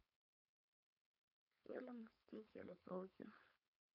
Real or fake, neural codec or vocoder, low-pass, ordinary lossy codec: fake; codec, 16 kHz, 4.8 kbps, FACodec; 5.4 kHz; none